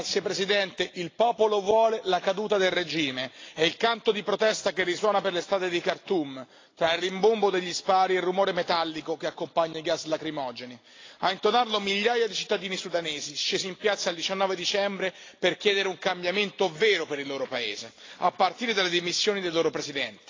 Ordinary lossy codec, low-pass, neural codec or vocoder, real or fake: AAC, 32 kbps; 7.2 kHz; none; real